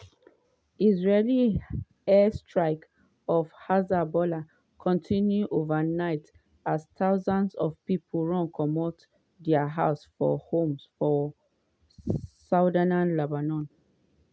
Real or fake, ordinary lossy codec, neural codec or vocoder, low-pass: real; none; none; none